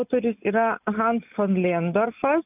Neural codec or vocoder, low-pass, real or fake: none; 3.6 kHz; real